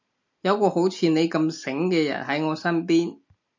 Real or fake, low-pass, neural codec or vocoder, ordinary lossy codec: real; 7.2 kHz; none; MP3, 64 kbps